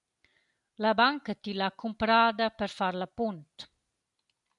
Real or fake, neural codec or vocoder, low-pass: real; none; 10.8 kHz